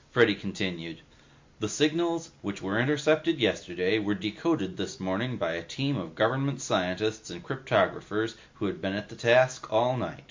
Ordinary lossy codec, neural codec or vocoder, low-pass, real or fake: MP3, 48 kbps; none; 7.2 kHz; real